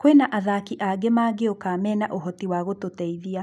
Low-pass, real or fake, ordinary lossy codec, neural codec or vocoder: none; real; none; none